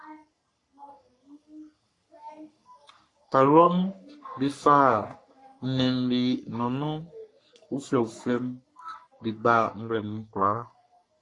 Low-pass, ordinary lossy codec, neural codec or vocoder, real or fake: 10.8 kHz; AAC, 48 kbps; codec, 44.1 kHz, 3.4 kbps, Pupu-Codec; fake